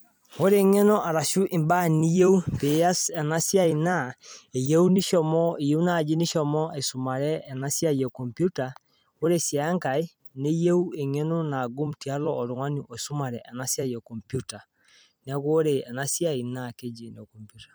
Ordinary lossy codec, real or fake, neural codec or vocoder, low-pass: none; fake; vocoder, 44.1 kHz, 128 mel bands every 256 samples, BigVGAN v2; none